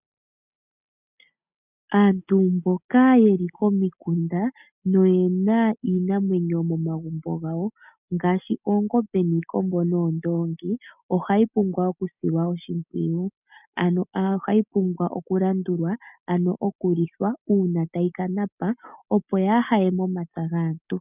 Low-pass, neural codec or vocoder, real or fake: 3.6 kHz; none; real